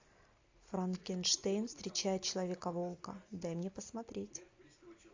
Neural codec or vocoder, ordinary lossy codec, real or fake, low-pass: none; AAC, 48 kbps; real; 7.2 kHz